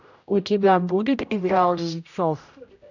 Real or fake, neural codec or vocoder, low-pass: fake; codec, 16 kHz, 0.5 kbps, X-Codec, HuBERT features, trained on general audio; 7.2 kHz